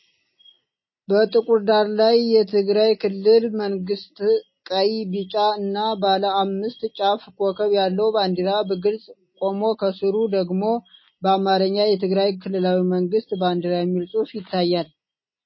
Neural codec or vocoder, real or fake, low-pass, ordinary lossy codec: none; real; 7.2 kHz; MP3, 24 kbps